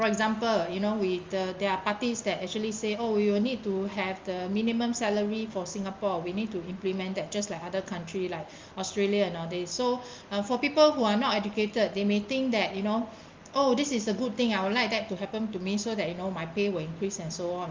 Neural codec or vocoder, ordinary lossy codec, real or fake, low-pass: none; Opus, 32 kbps; real; 7.2 kHz